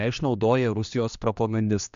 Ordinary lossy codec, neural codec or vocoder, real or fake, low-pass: MP3, 64 kbps; codec, 16 kHz, 2 kbps, X-Codec, HuBERT features, trained on general audio; fake; 7.2 kHz